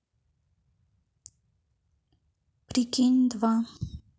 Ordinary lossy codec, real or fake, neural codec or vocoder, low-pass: none; real; none; none